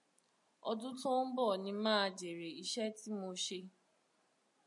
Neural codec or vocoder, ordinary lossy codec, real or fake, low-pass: none; MP3, 96 kbps; real; 10.8 kHz